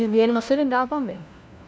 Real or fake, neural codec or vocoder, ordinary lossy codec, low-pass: fake; codec, 16 kHz, 1 kbps, FunCodec, trained on LibriTTS, 50 frames a second; none; none